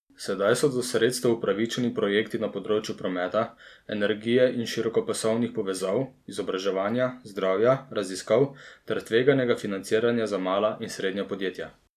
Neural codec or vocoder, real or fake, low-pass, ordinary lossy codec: none; real; 14.4 kHz; none